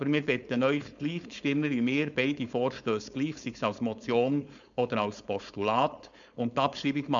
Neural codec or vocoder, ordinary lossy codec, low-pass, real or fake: codec, 16 kHz, 4.8 kbps, FACodec; none; 7.2 kHz; fake